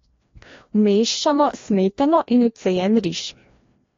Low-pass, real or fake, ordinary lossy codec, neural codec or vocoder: 7.2 kHz; fake; AAC, 32 kbps; codec, 16 kHz, 1 kbps, FreqCodec, larger model